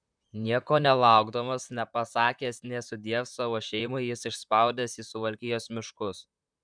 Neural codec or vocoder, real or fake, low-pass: vocoder, 44.1 kHz, 128 mel bands, Pupu-Vocoder; fake; 9.9 kHz